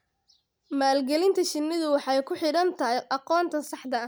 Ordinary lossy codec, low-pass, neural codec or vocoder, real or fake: none; none; none; real